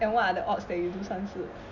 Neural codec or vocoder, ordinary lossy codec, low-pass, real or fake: none; none; 7.2 kHz; real